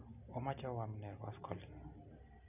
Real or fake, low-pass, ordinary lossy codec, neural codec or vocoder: real; 3.6 kHz; none; none